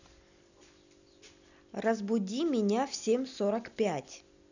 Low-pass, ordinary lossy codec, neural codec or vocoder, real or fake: 7.2 kHz; none; none; real